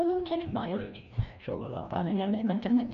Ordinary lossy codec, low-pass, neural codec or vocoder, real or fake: none; 7.2 kHz; codec, 16 kHz, 1 kbps, FreqCodec, larger model; fake